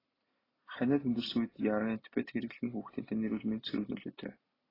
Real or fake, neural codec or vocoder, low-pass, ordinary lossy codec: real; none; 5.4 kHz; AAC, 24 kbps